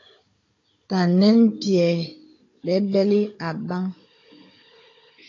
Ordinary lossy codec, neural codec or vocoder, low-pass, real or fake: AAC, 32 kbps; codec, 16 kHz, 16 kbps, FunCodec, trained on Chinese and English, 50 frames a second; 7.2 kHz; fake